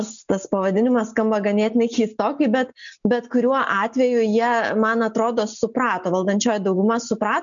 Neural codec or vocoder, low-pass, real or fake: none; 7.2 kHz; real